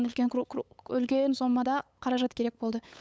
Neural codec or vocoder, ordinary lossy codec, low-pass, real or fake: codec, 16 kHz, 8 kbps, FunCodec, trained on LibriTTS, 25 frames a second; none; none; fake